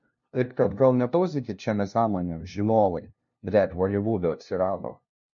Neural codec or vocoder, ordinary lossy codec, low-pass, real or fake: codec, 16 kHz, 0.5 kbps, FunCodec, trained on LibriTTS, 25 frames a second; MP3, 48 kbps; 7.2 kHz; fake